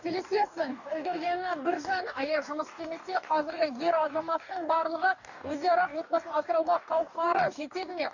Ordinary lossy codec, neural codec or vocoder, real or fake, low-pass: none; codec, 44.1 kHz, 2.6 kbps, DAC; fake; 7.2 kHz